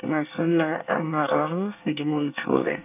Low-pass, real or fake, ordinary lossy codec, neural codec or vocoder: 3.6 kHz; fake; none; codec, 24 kHz, 1 kbps, SNAC